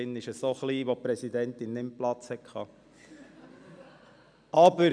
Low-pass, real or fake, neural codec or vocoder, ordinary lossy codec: 9.9 kHz; real; none; none